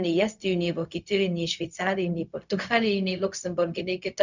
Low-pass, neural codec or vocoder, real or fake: 7.2 kHz; codec, 16 kHz, 0.4 kbps, LongCat-Audio-Codec; fake